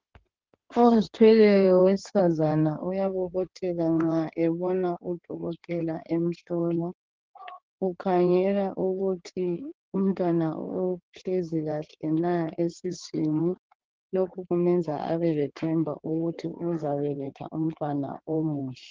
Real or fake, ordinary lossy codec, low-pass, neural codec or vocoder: fake; Opus, 16 kbps; 7.2 kHz; codec, 16 kHz in and 24 kHz out, 2.2 kbps, FireRedTTS-2 codec